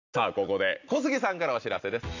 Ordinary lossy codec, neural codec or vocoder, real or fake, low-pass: AAC, 48 kbps; none; real; 7.2 kHz